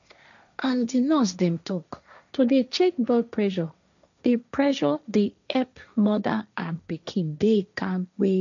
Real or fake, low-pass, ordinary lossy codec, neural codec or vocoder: fake; 7.2 kHz; none; codec, 16 kHz, 1.1 kbps, Voila-Tokenizer